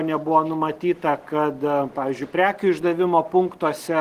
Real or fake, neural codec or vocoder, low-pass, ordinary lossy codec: real; none; 14.4 kHz; Opus, 16 kbps